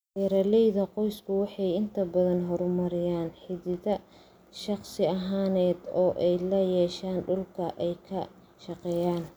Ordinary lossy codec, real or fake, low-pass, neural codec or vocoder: none; real; none; none